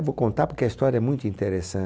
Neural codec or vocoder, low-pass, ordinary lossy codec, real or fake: none; none; none; real